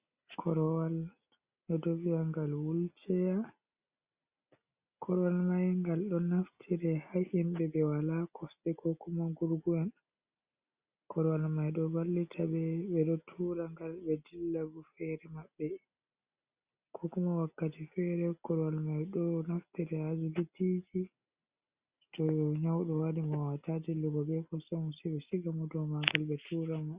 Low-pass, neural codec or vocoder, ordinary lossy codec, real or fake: 3.6 kHz; none; Opus, 64 kbps; real